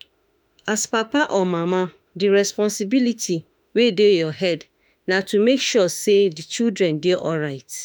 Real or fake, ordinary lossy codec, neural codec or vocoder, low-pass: fake; none; autoencoder, 48 kHz, 32 numbers a frame, DAC-VAE, trained on Japanese speech; none